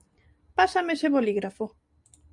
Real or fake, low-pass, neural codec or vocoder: real; 10.8 kHz; none